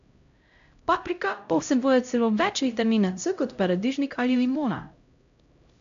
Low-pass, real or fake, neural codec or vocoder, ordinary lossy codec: 7.2 kHz; fake; codec, 16 kHz, 0.5 kbps, X-Codec, HuBERT features, trained on LibriSpeech; MP3, 64 kbps